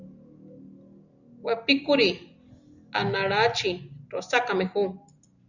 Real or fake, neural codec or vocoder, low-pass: real; none; 7.2 kHz